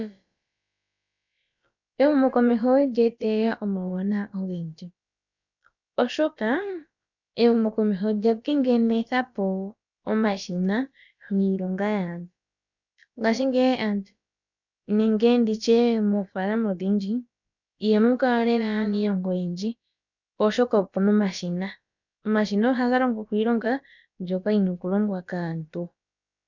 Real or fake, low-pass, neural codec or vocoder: fake; 7.2 kHz; codec, 16 kHz, about 1 kbps, DyCAST, with the encoder's durations